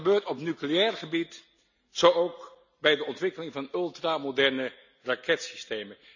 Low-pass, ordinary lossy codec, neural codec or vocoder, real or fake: 7.2 kHz; none; none; real